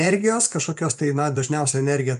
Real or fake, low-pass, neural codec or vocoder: fake; 10.8 kHz; vocoder, 24 kHz, 100 mel bands, Vocos